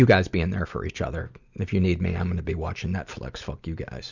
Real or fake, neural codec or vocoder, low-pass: real; none; 7.2 kHz